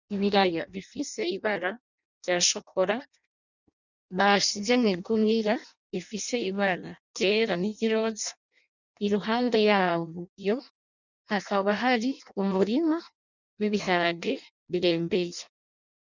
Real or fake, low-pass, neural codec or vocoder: fake; 7.2 kHz; codec, 16 kHz in and 24 kHz out, 0.6 kbps, FireRedTTS-2 codec